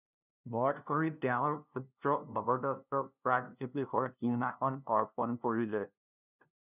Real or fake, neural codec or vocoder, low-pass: fake; codec, 16 kHz, 0.5 kbps, FunCodec, trained on LibriTTS, 25 frames a second; 3.6 kHz